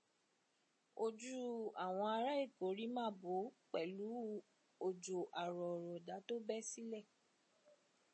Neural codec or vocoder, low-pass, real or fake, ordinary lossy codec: none; 9.9 kHz; real; MP3, 32 kbps